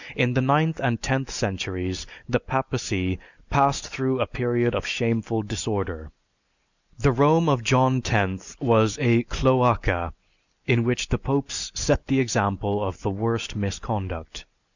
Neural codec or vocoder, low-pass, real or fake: none; 7.2 kHz; real